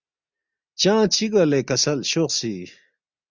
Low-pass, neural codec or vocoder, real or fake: 7.2 kHz; none; real